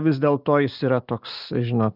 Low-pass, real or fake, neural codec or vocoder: 5.4 kHz; real; none